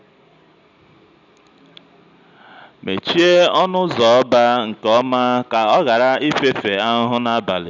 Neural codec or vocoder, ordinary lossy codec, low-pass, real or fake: none; none; 7.2 kHz; real